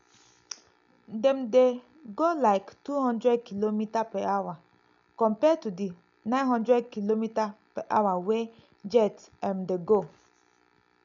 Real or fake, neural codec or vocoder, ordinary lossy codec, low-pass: real; none; MP3, 64 kbps; 7.2 kHz